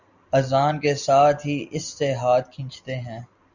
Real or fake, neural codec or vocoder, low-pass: real; none; 7.2 kHz